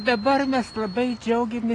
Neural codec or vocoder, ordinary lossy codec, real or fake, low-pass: none; AAC, 32 kbps; real; 10.8 kHz